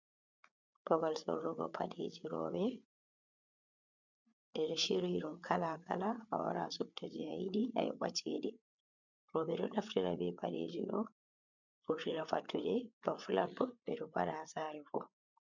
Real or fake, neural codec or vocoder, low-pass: fake; codec, 16 kHz, 8 kbps, FreqCodec, larger model; 7.2 kHz